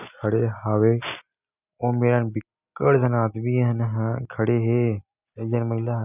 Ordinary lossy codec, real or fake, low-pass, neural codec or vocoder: none; real; 3.6 kHz; none